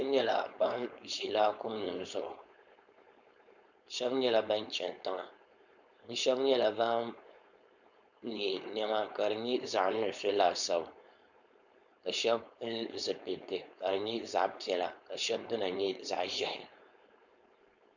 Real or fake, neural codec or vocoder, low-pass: fake; codec, 16 kHz, 4.8 kbps, FACodec; 7.2 kHz